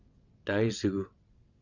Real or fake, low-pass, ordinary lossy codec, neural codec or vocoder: fake; 7.2 kHz; Opus, 64 kbps; vocoder, 22.05 kHz, 80 mel bands, WaveNeXt